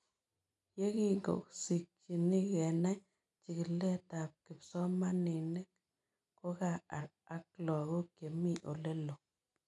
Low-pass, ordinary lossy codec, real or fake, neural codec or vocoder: 10.8 kHz; none; real; none